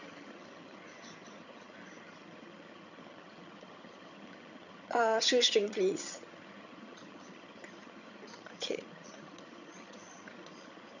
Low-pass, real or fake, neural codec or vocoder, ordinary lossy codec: 7.2 kHz; fake; vocoder, 22.05 kHz, 80 mel bands, HiFi-GAN; none